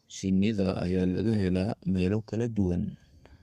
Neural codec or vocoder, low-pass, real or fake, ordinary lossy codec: codec, 32 kHz, 1.9 kbps, SNAC; 14.4 kHz; fake; Opus, 64 kbps